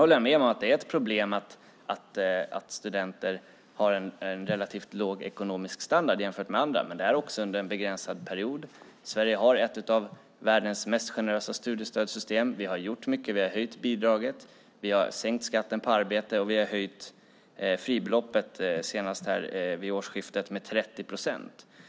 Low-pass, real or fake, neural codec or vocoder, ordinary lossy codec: none; real; none; none